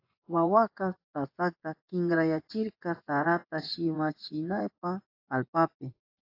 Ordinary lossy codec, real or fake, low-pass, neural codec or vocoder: AAC, 32 kbps; fake; 5.4 kHz; vocoder, 24 kHz, 100 mel bands, Vocos